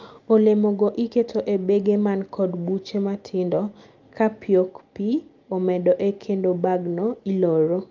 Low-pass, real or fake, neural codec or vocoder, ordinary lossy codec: 7.2 kHz; real; none; Opus, 24 kbps